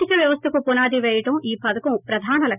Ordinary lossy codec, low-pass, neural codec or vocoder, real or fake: none; 3.6 kHz; none; real